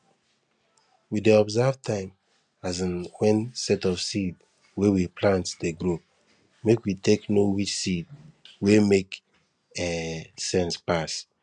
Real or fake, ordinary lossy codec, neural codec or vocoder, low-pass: real; none; none; 9.9 kHz